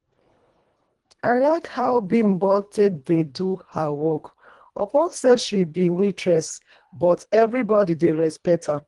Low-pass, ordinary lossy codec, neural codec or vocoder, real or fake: 10.8 kHz; Opus, 24 kbps; codec, 24 kHz, 1.5 kbps, HILCodec; fake